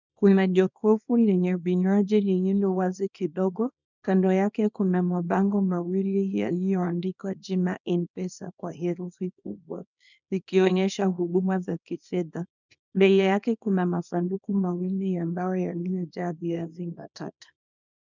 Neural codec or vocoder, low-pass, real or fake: codec, 24 kHz, 0.9 kbps, WavTokenizer, small release; 7.2 kHz; fake